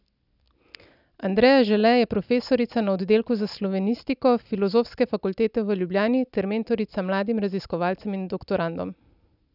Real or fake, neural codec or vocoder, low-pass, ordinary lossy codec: real; none; 5.4 kHz; none